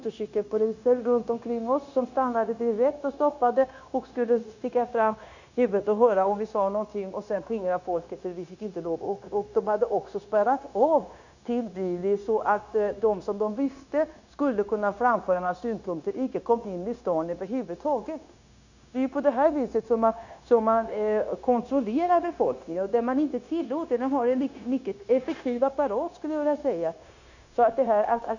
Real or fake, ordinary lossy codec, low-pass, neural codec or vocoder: fake; none; 7.2 kHz; codec, 16 kHz, 0.9 kbps, LongCat-Audio-Codec